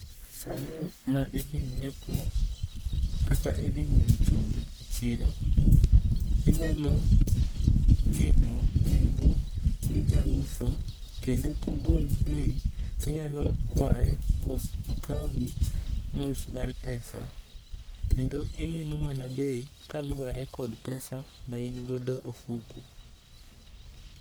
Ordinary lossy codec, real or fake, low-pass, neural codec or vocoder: none; fake; none; codec, 44.1 kHz, 1.7 kbps, Pupu-Codec